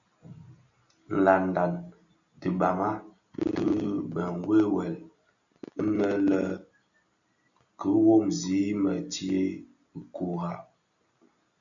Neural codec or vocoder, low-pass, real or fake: none; 7.2 kHz; real